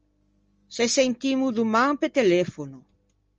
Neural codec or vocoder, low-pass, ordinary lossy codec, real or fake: none; 7.2 kHz; Opus, 24 kbps; real